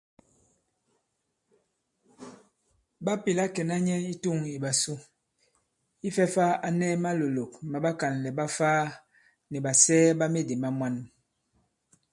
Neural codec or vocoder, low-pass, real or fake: none; 10.8 kHz; real